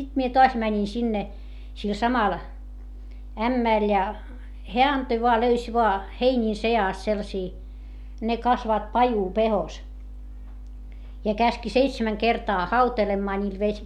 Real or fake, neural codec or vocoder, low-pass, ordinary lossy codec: real; none; 19.8 kHz; MP3, 96 kbps